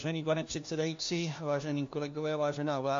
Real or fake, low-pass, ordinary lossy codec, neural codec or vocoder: fake; 7.2 kHz; MP3, 48 kbps; codec, 16 kHz, 1 kbps, FunCodec, trained on LibriTTS, 50 frames a second